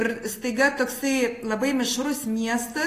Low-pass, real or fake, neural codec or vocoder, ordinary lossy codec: 14.4 kHz; real; none; AAC, 48 kbps